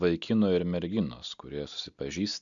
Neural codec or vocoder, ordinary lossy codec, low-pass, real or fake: none; MP3, 64 kbps; 7.2 kHz; real